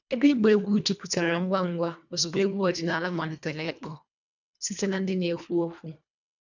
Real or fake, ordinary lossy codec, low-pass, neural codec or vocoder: fake; none; 7.2 kHz; codec, 24 kHz, 1.5 kbps, HILCodec